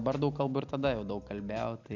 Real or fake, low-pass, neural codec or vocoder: real; 7.2 kHz; none